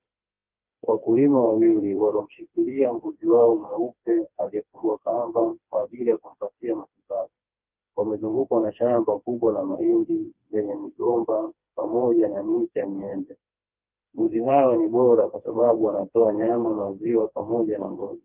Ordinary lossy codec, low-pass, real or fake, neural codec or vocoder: Opus, 24 kbps; 3.6 kHz; fake; codec, 16 kHz, 2 kbps, FreqCodec, smaller model